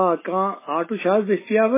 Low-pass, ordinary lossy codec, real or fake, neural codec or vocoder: 3.6 kHz; MP3, 16 kbps; real; none